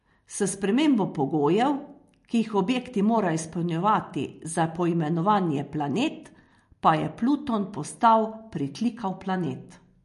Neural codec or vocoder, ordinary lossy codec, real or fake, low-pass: none; MP3, 48 kbps; real; 14.4 kHz